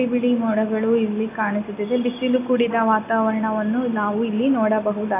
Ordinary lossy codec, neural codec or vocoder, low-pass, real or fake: AAC, 24 kbps; none; 3.6 kHz; real